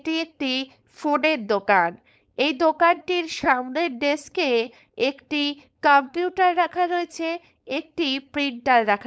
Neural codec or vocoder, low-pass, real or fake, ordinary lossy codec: codec, 16 kHz, 4.8 kbps, FACodec; none; fake; none